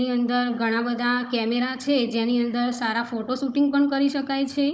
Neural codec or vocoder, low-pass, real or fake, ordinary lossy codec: codec, 16 kHz, 16 kbps, FunCodec, trained on Chinese and English, 50 frames a second; none; fake; none